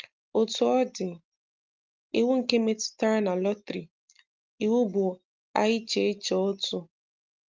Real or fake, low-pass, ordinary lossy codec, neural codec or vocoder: real; 7.2 kHz; Opus, 32 kbps; none